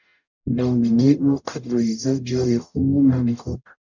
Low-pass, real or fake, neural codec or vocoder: 7.2 kHz; fake; codec, 44.1 kHz, 0.9 kbps, DAC